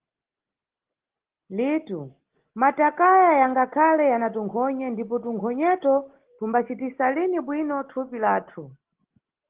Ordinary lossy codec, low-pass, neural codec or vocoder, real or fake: Opus, 16 kbps; 3.6 kHz; none; real